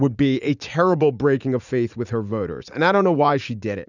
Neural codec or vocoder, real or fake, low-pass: vocoder, 44.1 kHz, 80 mel bands, Vocos; fake; 7.2 kHz